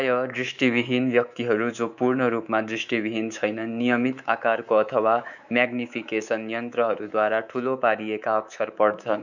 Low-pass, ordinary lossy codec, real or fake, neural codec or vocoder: 7.2 kHz; none; fake; codec, 24 kHz, 3.1 kbps, DualCodec